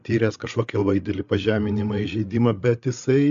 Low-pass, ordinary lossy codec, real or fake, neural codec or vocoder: 7.2 kHz; AAC, 64 kbps; fake; codec, 16 kHz, 8 kbps, FreqCodec, larger model